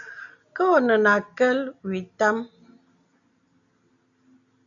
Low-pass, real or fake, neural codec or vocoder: 7.2 kHz; real; none